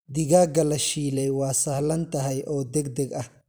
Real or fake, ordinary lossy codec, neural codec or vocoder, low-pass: fake; none; vocoder, 44.1 kHz, 128 mel bands every 256 samples, BigVGAN v2; none